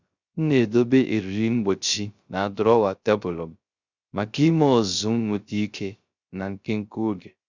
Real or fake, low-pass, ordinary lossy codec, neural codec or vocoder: fake; 7.2 kHz; Opus, 64 kbps; codec, 16 kHz, 0.3 kbps, FocalCodec